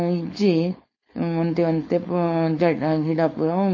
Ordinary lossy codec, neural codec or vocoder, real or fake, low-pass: MP3, 32 kbps; codec, 16 kHz, 4.8 kbps, FACodec; fake; 7.2 kHz